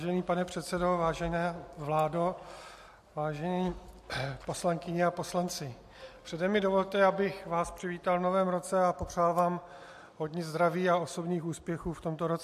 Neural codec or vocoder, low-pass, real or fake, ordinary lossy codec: none; 14.4 kHz; real; MP3, 64 kbps